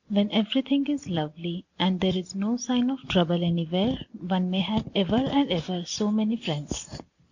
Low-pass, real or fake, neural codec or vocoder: 7.2 kHz; real; none